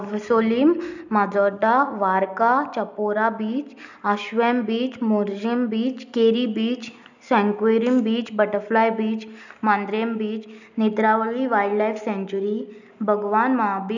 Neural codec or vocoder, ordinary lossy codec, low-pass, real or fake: none; none; 7.2 kHz; real